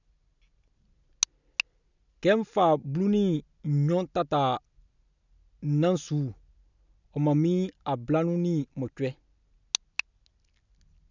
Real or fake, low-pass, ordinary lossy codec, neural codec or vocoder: real; 7.2 kHz; none; none